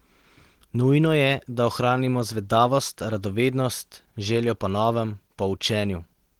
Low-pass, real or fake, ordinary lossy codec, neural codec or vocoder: 19.8 kHz; real; Opus, 16 kbps; none